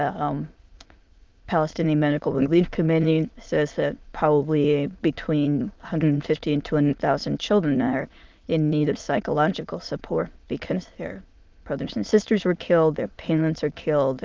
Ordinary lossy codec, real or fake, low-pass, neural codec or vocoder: Opus, 32 kbps; fake; 7.2 kHz; autoencoder, 22.05 kHz, a latent of 192 numbers a frame, VITS, trained on many speakers